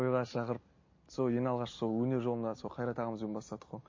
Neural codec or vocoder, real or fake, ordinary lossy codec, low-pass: none; real; MP3, 32 kbps; 7.2 kHz